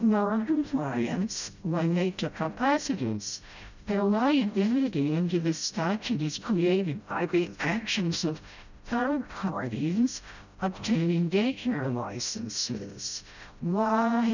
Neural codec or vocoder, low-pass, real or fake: codec, 16 kHz, 0.5 kbps, FreqCodec, smaller model; 7.2 kHz; fake